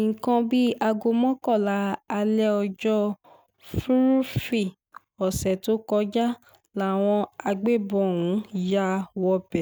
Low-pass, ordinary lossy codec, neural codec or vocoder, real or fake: none; none; none; real